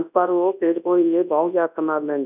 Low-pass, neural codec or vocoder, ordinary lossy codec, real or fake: 3.6 kHz; codec, 24 kHz, 0.9 kbps, WavTokenizer, large speech release; none; fake